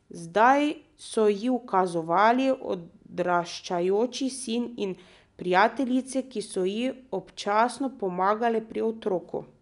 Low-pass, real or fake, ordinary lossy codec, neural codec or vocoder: 10.8 kHz; real; none; none